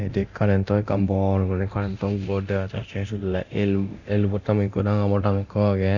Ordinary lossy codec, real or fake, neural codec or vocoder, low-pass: none; fake; codec, 24 kHz, 0.9 kbps, DualCodec; 7.2 kHz